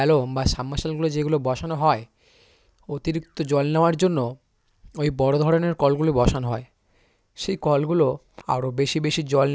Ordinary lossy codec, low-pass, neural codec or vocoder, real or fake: none; none; none; real